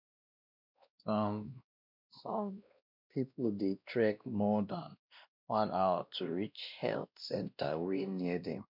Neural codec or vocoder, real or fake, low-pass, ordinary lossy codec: codec, 16 kHz, 1 kbps, X-Codec, WavLM features, trained on Multilingual LibriSpeech; fake; 5.4 kHz; MP3, 48 kbps